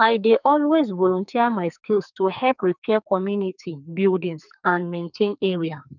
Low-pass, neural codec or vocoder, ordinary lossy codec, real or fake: 7.2 kHz; codec, 44.1 kHz, 2.6 kbps, SNAC; none; fake